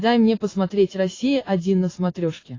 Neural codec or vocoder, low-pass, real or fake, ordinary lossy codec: none; 7.2 kHz; real; AAC, 32 kbps